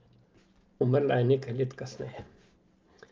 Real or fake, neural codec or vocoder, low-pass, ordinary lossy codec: real; none; 7.2 kHz; Opus, 24 kbps